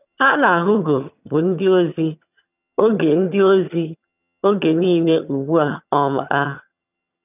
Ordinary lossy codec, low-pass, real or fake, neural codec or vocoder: none; 3.6 kHz; fake; vocoder, 22.05 kHz, 80 mel bands, HiFi-GAN